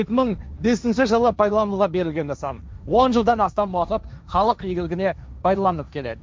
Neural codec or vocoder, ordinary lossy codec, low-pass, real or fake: codec, 16 kHz, 1.1 kbps, Voila-Tokenizer; none; none; fake